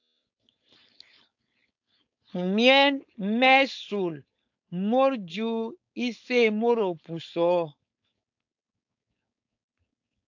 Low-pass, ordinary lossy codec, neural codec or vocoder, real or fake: 7.2 kHz; AAC, 48 kbps; codec, 16 kHz, 4.8 kbps, FACodec; fake